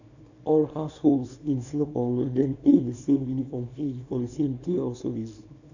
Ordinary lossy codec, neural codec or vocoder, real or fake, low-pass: none; codec, 24 kHz, 0.9 kbps, WavTokenizer, small release; fake; 7.2 kHz